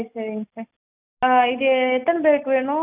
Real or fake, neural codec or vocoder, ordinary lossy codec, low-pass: real; none; none; 3.6 kHz